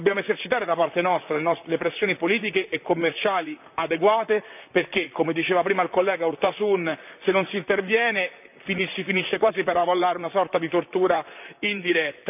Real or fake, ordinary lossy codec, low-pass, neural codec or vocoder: fake; none; 3.6 kHz; vocoder, 44.1 kHz, 128 mel bands, Pupu-Vocoder